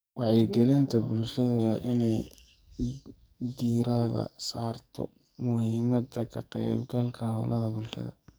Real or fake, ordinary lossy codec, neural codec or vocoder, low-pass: fake; none; codec, 44.1 kHz, 2.6 kbps, SNAC; none